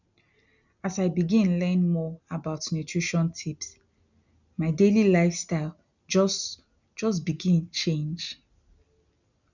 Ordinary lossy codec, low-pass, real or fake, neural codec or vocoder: none; 7.2 kHz; real; none